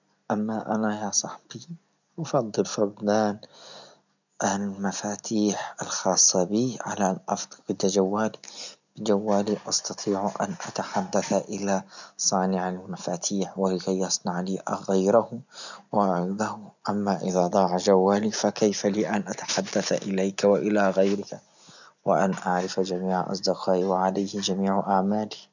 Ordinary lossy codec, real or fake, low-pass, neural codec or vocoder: none; real; 7.2 kHz; none